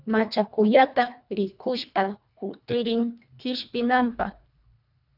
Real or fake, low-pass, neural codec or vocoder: fake; 5.4 kHz; codec, 24 kHz, 1.5 kbps, HILCodec